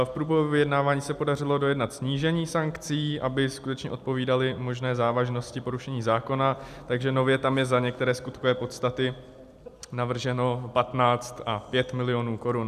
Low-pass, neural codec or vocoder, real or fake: 14.4 kHz; none; real